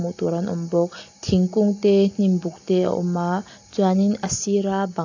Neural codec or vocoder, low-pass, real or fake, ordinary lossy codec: none; 7.2 kHz; real; AAC, 48 kbps